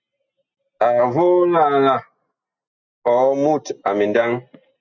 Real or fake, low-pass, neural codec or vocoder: real; 7.2 kHz; none